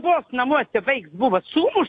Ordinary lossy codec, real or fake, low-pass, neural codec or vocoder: AAC, 48 kbps; real; 9.9 kHz; none